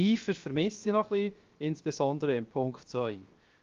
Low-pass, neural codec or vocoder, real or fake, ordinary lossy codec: 7.2 kHz; codec, 16 kHz, 0.7 kbps, FocalCodec; fake; Opus, 32 kbps